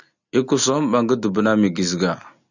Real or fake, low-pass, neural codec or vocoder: real; 7.2 kHz; none